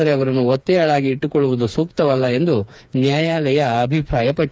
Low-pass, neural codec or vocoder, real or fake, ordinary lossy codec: none; codec, 16 kHz, 4 kbps, FreqCodec, smaller model; fake; none